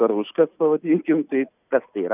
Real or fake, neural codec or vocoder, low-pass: real; none; 3.6 kHz